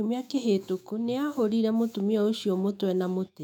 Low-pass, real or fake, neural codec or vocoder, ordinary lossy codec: 19.8 kHz; fake; autoencoder, 48 kHz, 128 numbers a frame, DAC-VAE, trained on Japanese speech; none